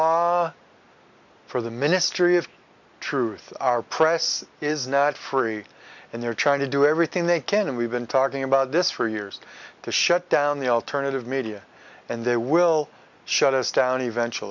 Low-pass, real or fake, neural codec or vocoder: 7.2 kHz; real; none